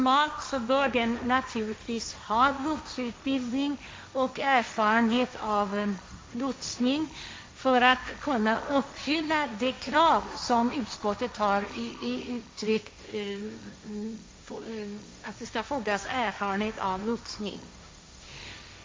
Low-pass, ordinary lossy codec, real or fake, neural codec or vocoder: none; none; fake; codec, 16 kHz, 1.1 kbps, Voila-Tokenizer